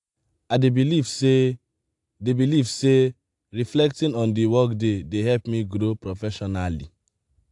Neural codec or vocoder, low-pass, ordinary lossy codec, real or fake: none; 10.8 kHz; AAC, 64 kbps; real